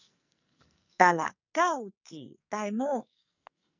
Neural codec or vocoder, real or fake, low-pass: codec, 44.1 kHz, 2.6 kbps, SNAC; fake; 7.2 kHz